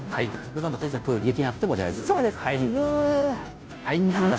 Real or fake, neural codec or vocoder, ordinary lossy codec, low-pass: fake; codec, 16 kHz, 0.5 kbps, FunCodec, trained on Chinese and English, 25 frames a second; none; none